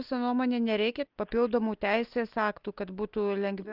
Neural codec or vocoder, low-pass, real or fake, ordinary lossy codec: none; 5.4 kHz; real; Opus, 32 kbps